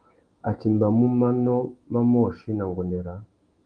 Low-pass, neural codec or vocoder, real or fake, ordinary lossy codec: 9.9 kHz; autoencoder, 48 kHz, 128 numbers a frame, DAC-VAE, trained on Japanese speech; fake; Opus, 24 kbps